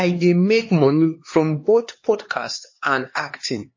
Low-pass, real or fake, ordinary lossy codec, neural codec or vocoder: 7.2 kHz; fake; MP3, 32 kbps; codec, 16 kHz, 2 kbps, X-Codec, HuBERT features, trained on LibriSpeech